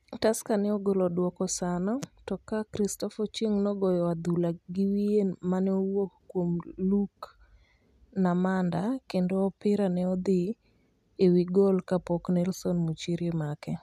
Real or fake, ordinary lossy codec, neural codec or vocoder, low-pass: real; none; none; 14.4 kHz